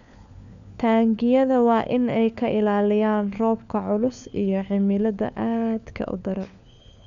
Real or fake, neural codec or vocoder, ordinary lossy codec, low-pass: fake; codec, 16 kHz, 4 kbps, FunCodec, trained on LibriTTS, 50 frames a second; none; 7.2 kHz